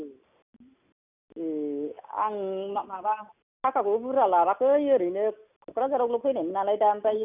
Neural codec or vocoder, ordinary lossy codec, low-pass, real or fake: none; none; 3.6 kHz; real